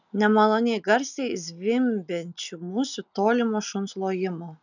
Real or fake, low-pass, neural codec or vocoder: real; 7.2 kHz; none